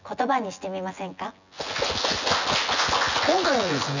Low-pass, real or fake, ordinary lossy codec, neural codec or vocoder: 7.2 kHz; fake; none; vocoder, 24 kHz, 100 mel bands, Vocos